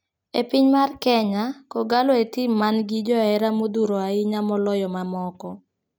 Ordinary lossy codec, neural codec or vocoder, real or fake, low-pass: none; none; real; none